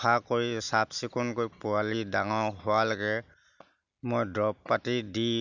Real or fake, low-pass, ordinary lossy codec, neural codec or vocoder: real; 7.2 kHz; none; none